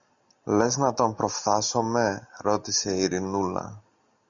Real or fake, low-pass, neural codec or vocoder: real; 7.2 kHz; none